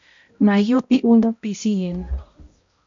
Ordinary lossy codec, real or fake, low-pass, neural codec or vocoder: MP3, 48 kbps; fake; 7.2 kHz; codec, 16 kHz, 0.5 kbps, X-Codec, HuBERT features, trained on balanced general audio